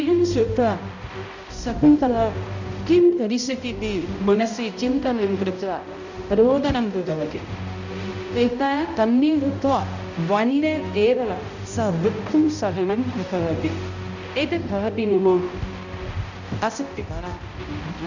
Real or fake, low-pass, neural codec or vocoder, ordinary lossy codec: fake; 7.2 kHz; codec, 16 kHz, 0.5 kbps, X-Codec, HuBERT features, trained on balanced general audio; none